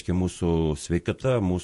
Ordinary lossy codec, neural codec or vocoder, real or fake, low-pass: MP3, 48 kbps; vocoder, 48 kHz, 128 mel bands, Vocos; fake; 14.4 kHz